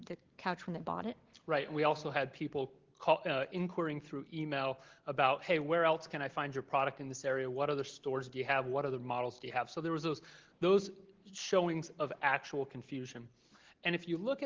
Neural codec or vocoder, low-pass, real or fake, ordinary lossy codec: none; 7.2 kHz; real; Opus, 16 kbps